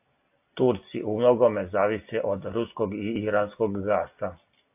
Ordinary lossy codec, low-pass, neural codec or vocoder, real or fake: AAC, 32 kbps; 3.6 kHz; none; real